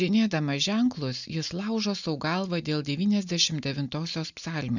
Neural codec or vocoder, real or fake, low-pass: none; real; 7.2 kHz